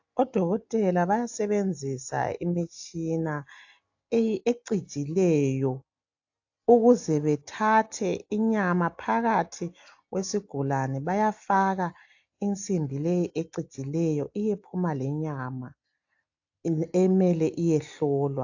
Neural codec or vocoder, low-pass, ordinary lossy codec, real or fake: none; 7.2 kHz; AAC, 48 kbps; real